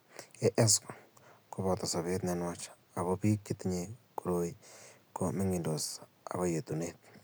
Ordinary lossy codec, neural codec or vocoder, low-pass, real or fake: none; none; none; real